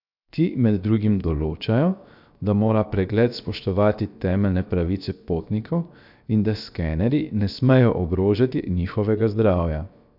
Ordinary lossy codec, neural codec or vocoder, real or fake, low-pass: none; codec, 16 kHz, 0.7 kbps, FocalCodec; fake; 5.4 kHz